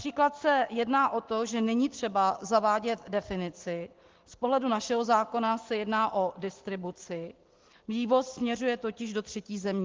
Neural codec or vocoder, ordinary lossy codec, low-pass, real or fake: none; Opus, 16 kbps; 7.2 kHz; real